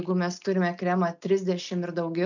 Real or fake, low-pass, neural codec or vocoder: real; 7.2 kHz; none